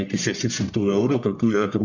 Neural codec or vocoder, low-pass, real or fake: codec, 44.1 kHz, 1.7 kbps, Pupu-Codec; 7.2 kHz; fake